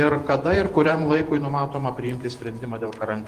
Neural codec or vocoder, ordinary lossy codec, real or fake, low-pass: vocoder, 48 kHz, 128 mel bands, Vocos; Opus, 24 kbps; fake; 14.4 kHz